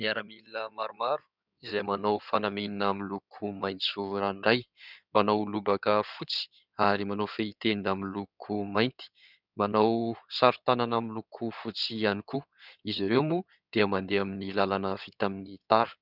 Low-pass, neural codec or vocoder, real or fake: 5.4 kHz; codec, 16 kHz in and 24 kHz out, 2.2 kbps, FireRedTTS-2 codec; fake